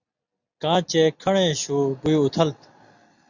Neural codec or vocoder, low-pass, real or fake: none; 7.2 kHz; real